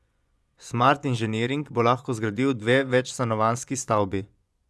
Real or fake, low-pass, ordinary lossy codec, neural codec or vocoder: fake; none; none; vocoder, 24 kHz, 100 mel bands, Vocos